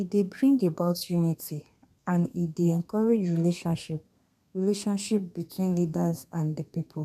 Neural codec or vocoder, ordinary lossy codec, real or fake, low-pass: codec, 32 kHz, 1.9 kbps, SNAC; none; fake; 14.4 kHz